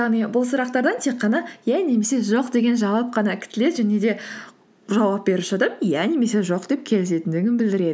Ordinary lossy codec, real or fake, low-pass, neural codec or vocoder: none; real; none; none